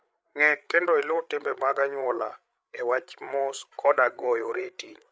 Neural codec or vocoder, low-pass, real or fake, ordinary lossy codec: codec, 16 kHz, 8 kbps, FreqCodec, larger model; none; fake; none